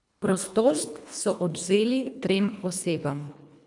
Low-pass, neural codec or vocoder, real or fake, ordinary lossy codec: 10.8 kHz; codec, 24 kHz, 1.5 kbps, HILCodec; fake; none